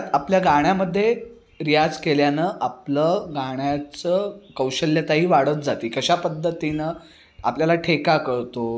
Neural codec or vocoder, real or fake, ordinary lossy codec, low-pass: none; real; none; none